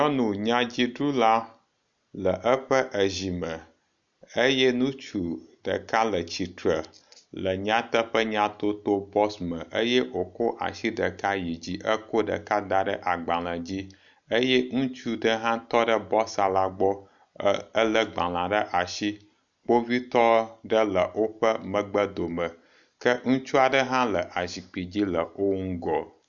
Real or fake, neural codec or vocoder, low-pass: real; none; 7.2 kHz